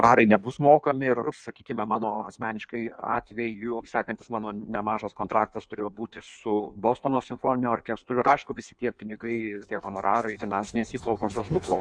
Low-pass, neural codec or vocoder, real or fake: 9.9 kHz; codec, 16 kHz in and 24 kHz out, 1.1 kbps, FireRedTTS-2 codec; fake